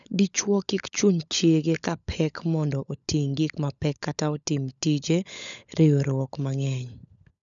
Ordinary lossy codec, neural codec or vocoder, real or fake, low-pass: none; codec, 16 kHz, 16 kbps, FunCodec, trained on LibriTTS, 50 frames a second; fake; 7.2 kHz